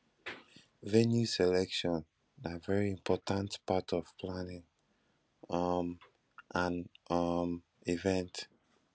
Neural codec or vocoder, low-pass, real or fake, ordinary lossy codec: none; none; real; none